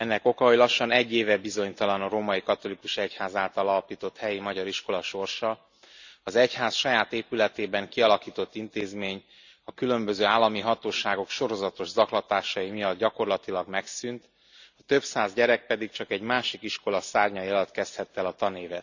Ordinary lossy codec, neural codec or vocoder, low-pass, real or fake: none; none; 7.2 kHz; real